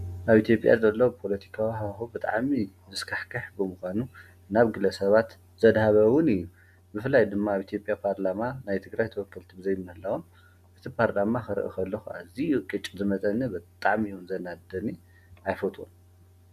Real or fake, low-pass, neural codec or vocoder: real; 14.4 kHz; none